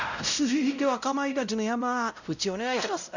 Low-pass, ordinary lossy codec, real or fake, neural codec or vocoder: 7.2 kHz; none; fake; codec, 16 kHz, 0.5 kbps, X-Codec, WavLM features, trained on Multilingual LibriSpeech